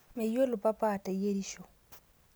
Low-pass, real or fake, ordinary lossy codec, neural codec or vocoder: none; real; none; none